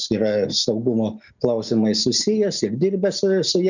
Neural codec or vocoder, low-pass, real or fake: none; 7.2 kHz; real